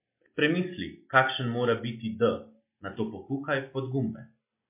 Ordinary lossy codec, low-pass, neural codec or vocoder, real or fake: MP3, 24 kbps; 3.6 kHz; none; real